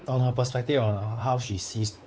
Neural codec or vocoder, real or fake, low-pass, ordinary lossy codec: codec, 16 kHz, 4 kbps, X-Codec, WavLM features, trained on Multilingual LibriSpeech; fake; none; none